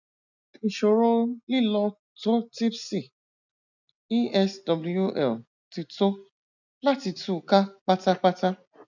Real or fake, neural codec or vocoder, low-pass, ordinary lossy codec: real; none; 7.2 kHz; none